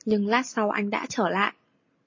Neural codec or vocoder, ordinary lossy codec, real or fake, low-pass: none; MP3, 32 kbps; real; 7.2 kHz